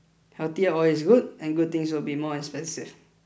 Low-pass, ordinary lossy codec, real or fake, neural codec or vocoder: none; none; real; none